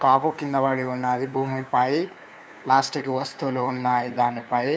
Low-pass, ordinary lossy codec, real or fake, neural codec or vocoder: none; none; fake; codec, 16 kHz, 2 kbps, FunCodec, trained on LibriTTS, 25 frames a second